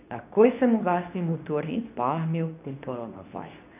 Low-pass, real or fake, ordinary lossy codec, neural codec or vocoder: 3.6 kHz; fake; none; codec, 24 kHz, 0.9 kbps, WavTokenizer, medium speech release version 2